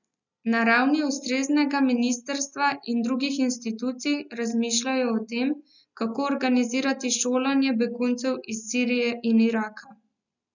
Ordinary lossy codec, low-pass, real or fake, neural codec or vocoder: none; 7.2 kHz; real; none